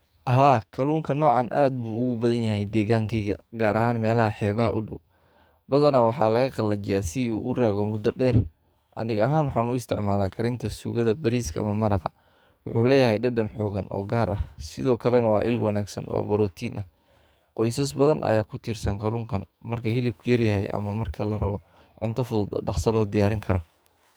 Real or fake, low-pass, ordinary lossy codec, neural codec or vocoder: fake; none; none; codec, 44.1 kHz, 2.6 kbps, SNAC